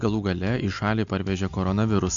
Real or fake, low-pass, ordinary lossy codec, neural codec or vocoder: real; 7.2 kHz; MP3, 64 kbps; none